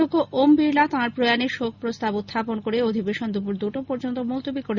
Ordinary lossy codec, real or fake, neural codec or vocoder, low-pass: Opus, 64 kbps; real; none; 7.2 kHz